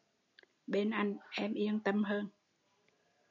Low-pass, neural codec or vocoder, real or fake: 7.2 kHz; none; real